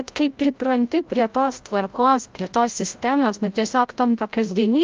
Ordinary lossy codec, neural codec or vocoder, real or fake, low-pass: Opus, 32 kbps; codec, 16 kHz, 0.5 kbps, FreqCodec, larger model; fake; 7.2 kHz